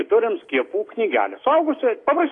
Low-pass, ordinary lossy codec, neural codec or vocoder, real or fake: 10.8 kHz; AAC, 32 kbps; none; real